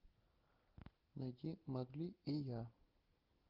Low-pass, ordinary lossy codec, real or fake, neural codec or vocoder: 5.4 kHz; Opus, 32 kbps; real; none